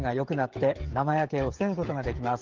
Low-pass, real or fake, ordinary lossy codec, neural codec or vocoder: 7.2 kHz; fake; Opus, 16 kbps; codec, 16 kHz, 16 kbps, FreqCodec, smaller model